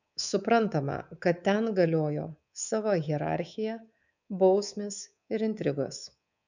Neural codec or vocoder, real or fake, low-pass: codec, 24 kHz, 3.1 kbps, DualCodec; fake; 7.2 kHz